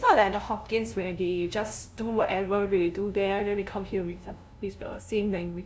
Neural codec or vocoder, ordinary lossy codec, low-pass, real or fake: codec, 16 kHz, 0.5 kbps, FunCodec, trained on LibriTTS, 25 frames a second; none; none; fake